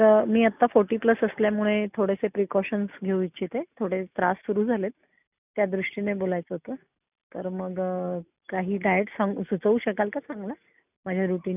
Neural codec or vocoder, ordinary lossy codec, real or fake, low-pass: none; none; real; 3.6 kHz